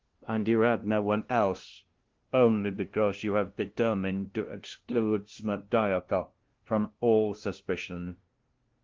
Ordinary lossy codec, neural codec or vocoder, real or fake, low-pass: Opus, 16 kbps; codec, 16 kHz, 0.5 kbps, FunCodec, trained on LibriTTS, 25 frames a second; fake; 7.2 kHz